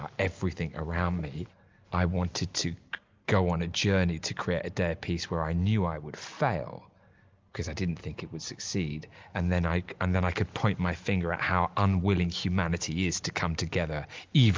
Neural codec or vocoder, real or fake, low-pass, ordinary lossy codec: none; real; 7.2 kHz; Opus, 24 kbps